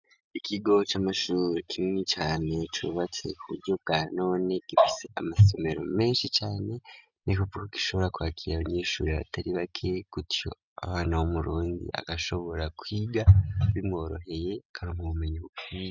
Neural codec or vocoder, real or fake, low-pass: none; real; 7.2 kHz